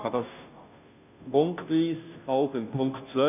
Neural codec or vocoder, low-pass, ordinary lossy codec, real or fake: codec, 16 kHz, 0.5 kbps, FunCodec, trained on Chinese and English, 25 frames a second; 3.6 kHz; none; fake